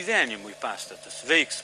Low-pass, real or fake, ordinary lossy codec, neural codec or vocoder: 10.8 kHz; real; Opus, 32 kbps; none